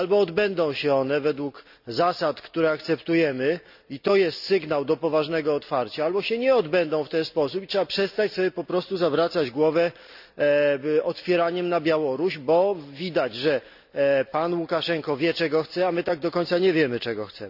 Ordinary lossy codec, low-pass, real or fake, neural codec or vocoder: none; 5.4 kHz; real; none